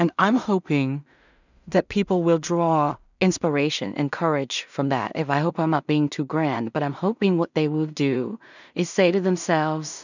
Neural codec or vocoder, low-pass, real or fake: codec, 16 kHz in and 24 kHz out, 0.4 kbps, LongCat-Audio-Codec, two codebook decoder; 7.2 kHz; fake